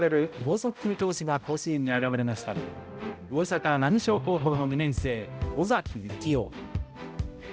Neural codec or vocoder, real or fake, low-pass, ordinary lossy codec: codec, 16 kHz, 0.5 kbps, X-Codec, HuBERT features, trained on balanced general audio; fake; none; none